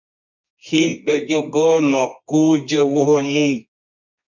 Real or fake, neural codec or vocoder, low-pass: fake; codec, 24 kHz, 0.9 kbps, WavTokenizer, medium music audio release; 7.2 kHz